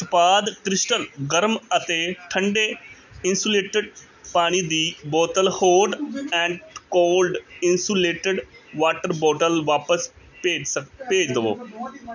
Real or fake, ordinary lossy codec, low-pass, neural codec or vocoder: real; none; 7.2 kHz; none